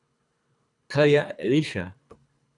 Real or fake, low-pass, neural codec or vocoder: fake; 10.8 kHz; codec, 24 kHz, 3 kbps, HILCodec